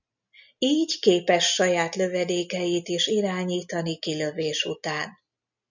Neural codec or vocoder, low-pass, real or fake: none; 7.2 kHz; real